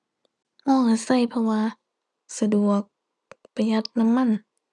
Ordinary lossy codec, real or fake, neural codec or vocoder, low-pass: none; real; none; 10.8 kHz